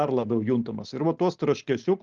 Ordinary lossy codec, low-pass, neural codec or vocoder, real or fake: Opus, 24 kbps; 7.2 kHz; none; real